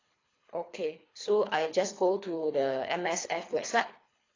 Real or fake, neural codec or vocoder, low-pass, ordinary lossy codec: fake; codec, 24 kHz, 3 kbps, HILCodec; 7.2 kHz; AAC, 32 kbps